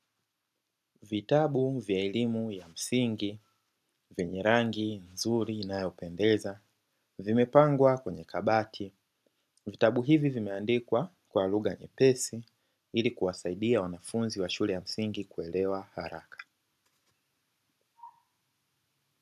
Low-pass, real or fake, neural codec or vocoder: 14.4 kHz; real; none